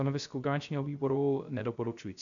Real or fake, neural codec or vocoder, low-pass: fake; codec, 16 kHz, 0.3 kbps, FocalCodec; 7.2 kHz